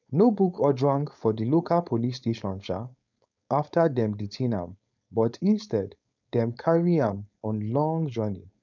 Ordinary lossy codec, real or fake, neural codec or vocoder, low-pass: none; fake; codec, 16 kHz, 4.8 kbps, FACodec; 7.2 kHz